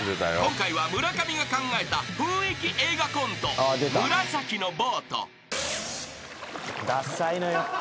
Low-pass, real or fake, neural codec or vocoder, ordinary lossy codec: none; real; none; none